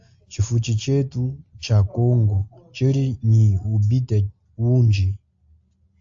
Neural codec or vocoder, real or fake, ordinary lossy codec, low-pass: none; real; AAC, 64 kbps; 7.2 kHz